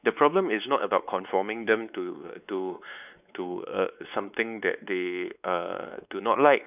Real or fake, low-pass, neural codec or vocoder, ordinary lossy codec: fake; 3.6 kHz; codec, 16 kHz, 4 kbps, X-Codec, WavLM features, trained on Multilingual LibriSpeech; none